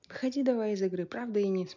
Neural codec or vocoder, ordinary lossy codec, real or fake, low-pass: none; none; real; 7.2 kHz